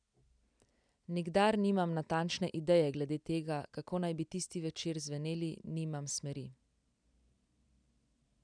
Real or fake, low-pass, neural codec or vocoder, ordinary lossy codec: real; 9.9 kHz; none; none